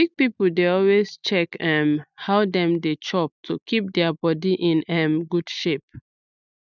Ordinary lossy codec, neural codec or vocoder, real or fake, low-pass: none; none; real; 7.2 kHz